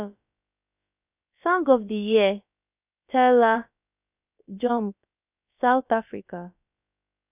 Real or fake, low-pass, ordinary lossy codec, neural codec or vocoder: fake; 3.6 kHz; none; codec, 16 kHz, about 1 kbps, DyCAST, with the encoder's durations